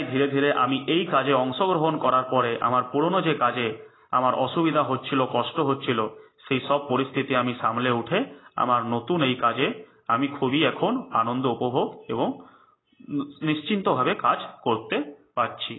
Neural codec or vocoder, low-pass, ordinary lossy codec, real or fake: none; 7.2 kHz; AAC, 16 kbps; real